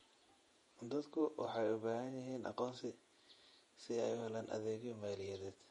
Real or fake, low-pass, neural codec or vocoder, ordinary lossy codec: fake; 19.8 kHz; vocoder, 48 kHz, 128 mel bands, Vocos; MP3, 48 kbps